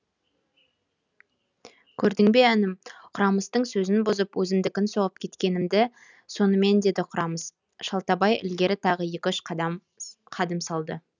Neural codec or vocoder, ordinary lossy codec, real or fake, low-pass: none; none; real; 7.2 kHz